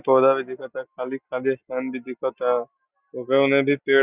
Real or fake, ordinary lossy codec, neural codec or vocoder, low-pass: real; Opus, 64 kbps; none; 3.6 kHz